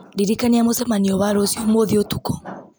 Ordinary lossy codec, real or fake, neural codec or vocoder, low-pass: none; real; none; none